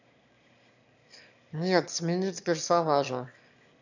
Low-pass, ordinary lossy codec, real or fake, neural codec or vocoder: 7.2 kHz; none; fake; autoencoder, 22.05 kHz, a latent of 192 numbers a frame, VITS, trained on one speaker